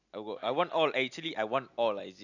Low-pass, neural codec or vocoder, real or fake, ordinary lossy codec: 7.2 kHz; none; real; none